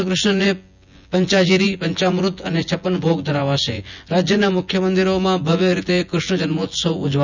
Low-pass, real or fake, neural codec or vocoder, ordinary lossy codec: 7.2 kHz; fake; vocoder, 24 kHz, 100 mel bands, Vocos; none